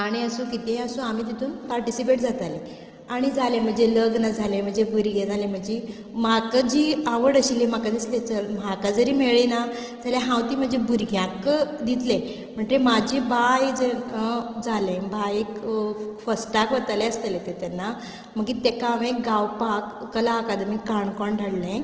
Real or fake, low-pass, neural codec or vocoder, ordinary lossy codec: real; 7.2 kHz; none; Opus, 16 kbps